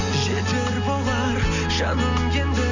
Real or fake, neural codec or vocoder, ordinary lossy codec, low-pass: real; none; none; 7.2 kHz